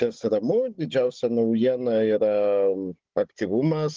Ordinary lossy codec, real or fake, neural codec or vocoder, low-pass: Opus, 16 kbps; fake; codec, 16 kHz, 16 kbps, FunCodec, trained on Chinese and English, 50 frames a second; 7.2 kHz